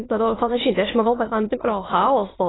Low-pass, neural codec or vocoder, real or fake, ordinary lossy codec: 7.2 kHz; autoencoder, 22.05 kHz, a latent of 192 numbers a frame, VITS, trained on many speakers; fake; AAC, 16 kbps